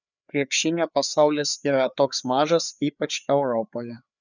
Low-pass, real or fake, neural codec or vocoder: 7.2 kHz; fake; codec, 16 kHz, 4 kbps, FreqCodec, larger model